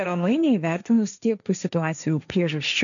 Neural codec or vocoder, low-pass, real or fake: codec, 16 kHz, 1.1 kbps, Voila-Tokenizer; 7.2 kHz; fake